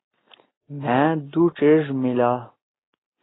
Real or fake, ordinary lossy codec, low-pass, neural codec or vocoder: real; AAC, 16 kbps; 7.2 kHz; none